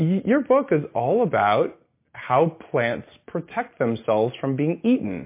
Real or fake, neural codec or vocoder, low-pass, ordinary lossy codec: real; none; 3.6 kHz; MP3, 24 kbps